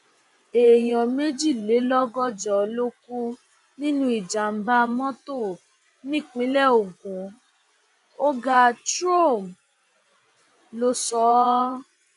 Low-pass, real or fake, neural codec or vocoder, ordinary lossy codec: 10.8 kHz; fake; vocoder, 24 kHz, 100 mel bands, Vocos; none